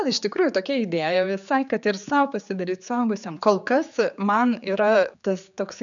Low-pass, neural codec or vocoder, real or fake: 7.2 kHz; codec, 16 kHz, 4 kbps, X-Codec, HuBERT features, trained on general audio; fake